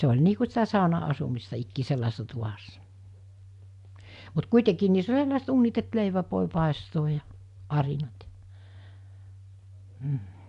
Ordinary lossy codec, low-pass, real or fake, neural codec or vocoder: AAC, 64 kbps; 10.8 kHz; real; none